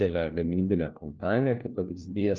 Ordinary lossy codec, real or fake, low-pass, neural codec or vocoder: Opus, 24 kbps; fake; 7.2 kHz; codec, 16 kHz, 1 kbps, FunCodec, trained on LibriTTS, 50 frames a second